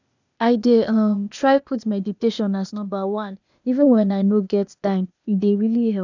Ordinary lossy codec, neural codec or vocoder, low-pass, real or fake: none; codec, 16 kHz, 0.8 kbps, ZipCodec; 7.2 kHz; fake